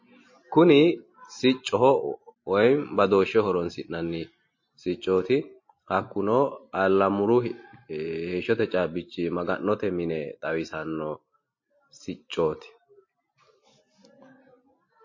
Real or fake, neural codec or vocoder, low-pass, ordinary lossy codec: real; none; 7.2 kHz; MP3, 32 kbps